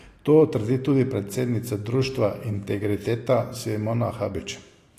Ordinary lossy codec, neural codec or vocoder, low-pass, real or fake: AAC, 48 kbps; none; 14.4 kHz; real